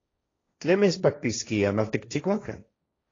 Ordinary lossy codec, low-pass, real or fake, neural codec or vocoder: AAC, 32 kbps; 7.2 kHz; fake; codec, 16 kHz, 1.1 kbps, Voila-Tokenizer